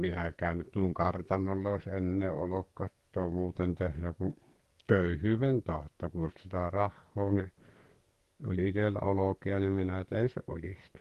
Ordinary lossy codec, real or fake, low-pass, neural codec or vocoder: Opus, 24 kbps; fake; 14.4 kHz; codec, 44.1 kHz, 2.6 kbps, SNAC